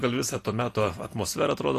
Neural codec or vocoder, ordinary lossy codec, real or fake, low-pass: none; AAC, 48 kbps; real; 14.4 kHz